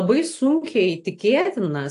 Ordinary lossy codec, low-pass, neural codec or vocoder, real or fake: AAC, 48 kbps; 10.8 kHz; none; real